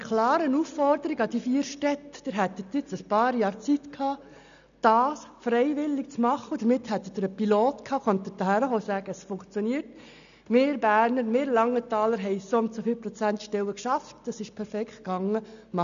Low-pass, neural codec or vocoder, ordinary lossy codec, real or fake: 7.2 kHz; none; none; real